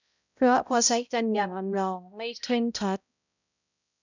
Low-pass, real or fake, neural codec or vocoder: 7.2 kHz; fake; codec, 16 kHz, 0.5 kbps, X-Codec, HuBERT features, trained on balanced general audio